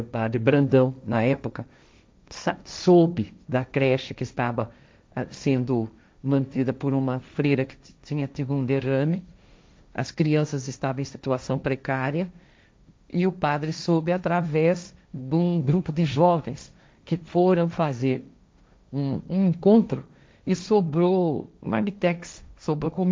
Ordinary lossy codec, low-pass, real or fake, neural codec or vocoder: none; none; fake; codec, 16 kHz, 1.1 kbps, Voila-Tokenizer